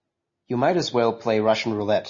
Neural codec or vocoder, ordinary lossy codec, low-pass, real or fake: none; MP3, 32 kbps; 7.2 kHz; real